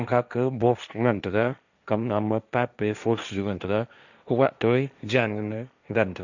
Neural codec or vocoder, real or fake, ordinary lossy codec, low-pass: codec, 16 kHz, 1.1 kbps, Voila-Tokenizer; fake; none; 7.2 kHz